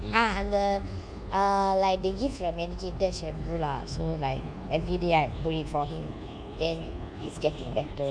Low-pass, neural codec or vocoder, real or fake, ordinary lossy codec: 9.9 kHz; codec, 24 kHz, 1.2 kbps, DualCodec; fake; none